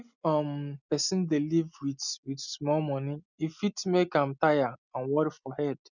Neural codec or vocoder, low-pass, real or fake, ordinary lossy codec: none; 7.2 kHz; real; none